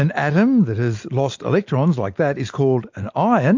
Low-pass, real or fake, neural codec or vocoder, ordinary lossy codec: 7.2 kHz; real; none; MP3, 48 kbps